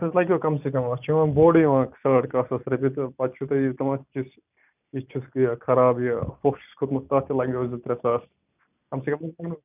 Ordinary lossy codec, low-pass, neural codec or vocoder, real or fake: none; 3.6 kHz; none; real